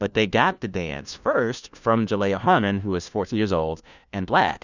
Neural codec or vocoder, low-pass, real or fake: codec, 16 kHz, 1 kbps, FunCodec, trained on LibriTTS, 50 frames a second; 7.2 kHz; fake